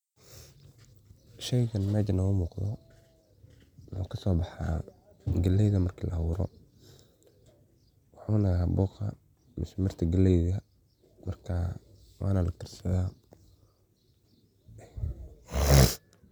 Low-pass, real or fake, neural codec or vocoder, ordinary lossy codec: 19.8 kHz; real; none; none